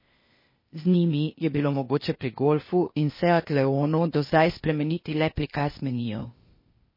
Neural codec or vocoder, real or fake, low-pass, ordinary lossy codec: codec, 16 kHz, 0.8 kbps, ZipCodec; fake; 5.4 kHz; MP3, 24 kbps